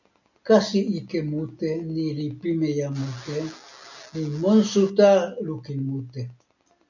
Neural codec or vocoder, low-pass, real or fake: none; 7.2 kHz; real